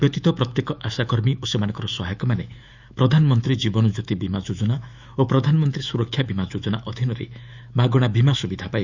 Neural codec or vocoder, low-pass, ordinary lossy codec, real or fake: autoencoder, 48 kHz, 128 numbers a frame, DAC-VAE, trained on Japanese speech; 7.2 kHz; Opus, 64 kbps; fake